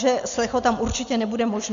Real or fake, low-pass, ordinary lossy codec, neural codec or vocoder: real; 7.2 kHz; MP3, 64 kbps; none